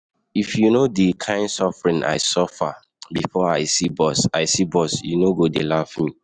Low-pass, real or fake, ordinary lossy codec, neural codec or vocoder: 9.9 kHz; real; none; none